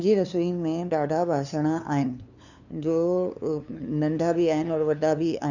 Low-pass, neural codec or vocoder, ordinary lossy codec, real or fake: 7.2 kHz; codec, 16 kHz, 2 kbps, FunCodec, trained on LibriTTS, 25 frames a second; none; fake